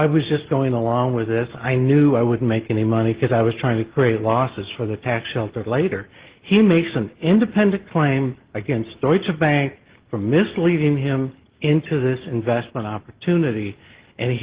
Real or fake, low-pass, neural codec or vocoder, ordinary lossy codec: real; 3.6 kHz; none; Opus, 16 kbps